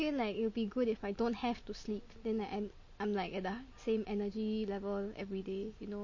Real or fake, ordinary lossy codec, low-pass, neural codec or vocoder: real; MP3, 32 kbps; 7.2 kHz; none